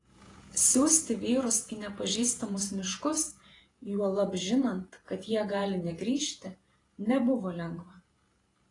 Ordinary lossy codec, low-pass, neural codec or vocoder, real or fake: AAC, 32 kbps; 10.8 kHz; vocoder, 48 kHz, 128 mel bands, Vocos; fake